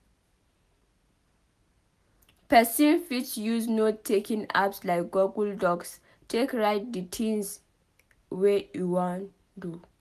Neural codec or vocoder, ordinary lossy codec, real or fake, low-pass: none; none; real; 14.4 kHz